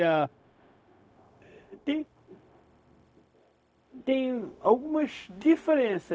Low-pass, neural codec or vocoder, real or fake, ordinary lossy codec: none; codec, 16 kHz, 0.4 kbps, LongCat-Audio-Codec; fake; none